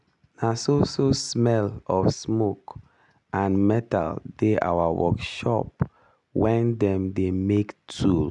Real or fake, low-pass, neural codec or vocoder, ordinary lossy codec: real; 10.8 kHz; none; none